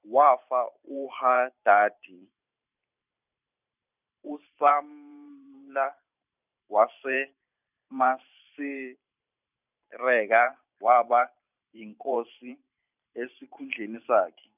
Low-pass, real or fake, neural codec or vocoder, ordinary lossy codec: 3.6 kHz; fake; vocoder, 44.1 kHz, 128 mel bands every 512 samples, BigVGAN v2; none